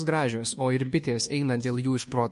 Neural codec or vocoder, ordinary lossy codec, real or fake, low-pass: codec, 24 kHz, 1.2 kbps, DualCodec; MP3, 48 kbps; fake; 10.8 kHz